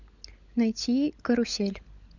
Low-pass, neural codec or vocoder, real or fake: 7.2 kHz; codec, 16 kHz, 16 kbps, FunCodec, trained on LibriTTS, 50 frames a second; fake